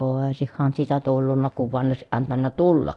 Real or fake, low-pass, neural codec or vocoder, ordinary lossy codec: fake; none; codec, 24 kHz, 0.9 kbps, DualCodec; none